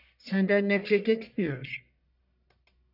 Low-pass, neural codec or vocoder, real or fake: 5.4 kHz; codec, 44.1 kHz, 1.7 kbps, Pupu-Codec; fake